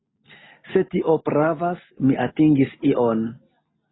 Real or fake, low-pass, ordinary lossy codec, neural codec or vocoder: real; 7.2 kHz; AAC, 16 kbps; none